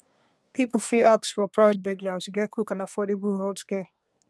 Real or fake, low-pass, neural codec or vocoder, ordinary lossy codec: fake; none; codec, 24 kHz, 1 kbps, SNAC; none